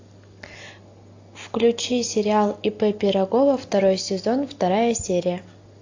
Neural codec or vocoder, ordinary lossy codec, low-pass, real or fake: none; AAC, 48 kbps; 7.2 kHz; real